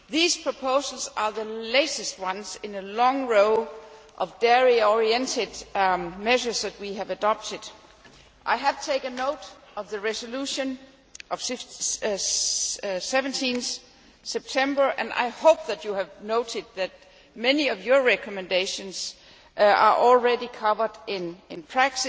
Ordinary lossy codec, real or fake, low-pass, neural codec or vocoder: none; real; none; none